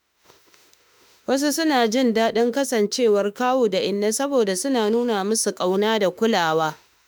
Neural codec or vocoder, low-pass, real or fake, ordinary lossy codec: autoencoder, 48 kHz, 32 numbers a frame, DAC-VAE, trained on Japanese speech; none; fake; none